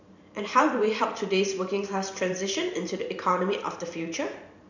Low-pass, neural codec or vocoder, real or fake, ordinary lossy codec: 7.2 kHz; vocoder, 44.1 kHz, 128 mel bands every 512 samples, BigVGAN v2; fake; none